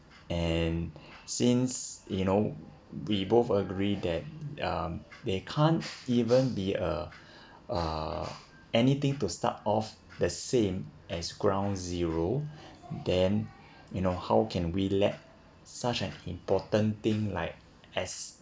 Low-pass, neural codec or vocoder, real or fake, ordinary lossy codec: none; none; real; none